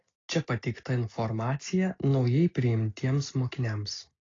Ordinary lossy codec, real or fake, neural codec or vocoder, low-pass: AAC, 32 kbps; real; none; 7.2 kHz